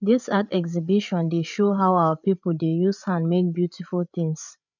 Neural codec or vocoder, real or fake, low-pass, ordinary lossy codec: codec, 16 kHz, 8 kbps, FreqCodec, larger model; fake; 7.2 kHz; none